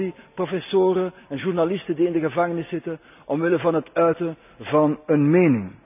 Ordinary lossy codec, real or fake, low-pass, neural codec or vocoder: none; real; 3.6 kHz; none